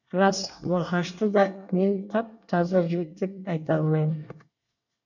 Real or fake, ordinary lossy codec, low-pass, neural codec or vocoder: fake; none; 7.2 kHz; codec, 24 kHz, 1 kbps, SNAC